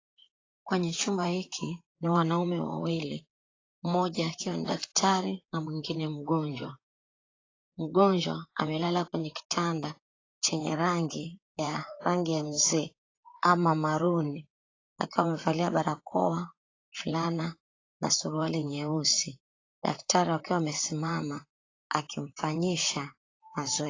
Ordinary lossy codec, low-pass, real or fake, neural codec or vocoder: AAC, 32 kbps; 7.2 kHz; fake; vocoder, 44.1 kHz, 128 mel bands, Pupu-Vocoder